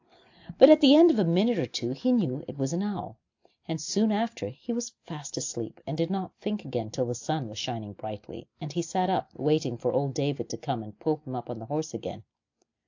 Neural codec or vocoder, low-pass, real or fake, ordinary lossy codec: none; 7.2 kHz; real; AAC, 48 kbps